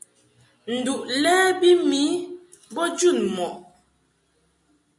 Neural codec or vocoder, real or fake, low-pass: none; real; 10.8 kHz